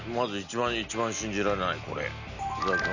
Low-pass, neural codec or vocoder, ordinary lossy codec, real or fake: 7.2 kHz; none; none; real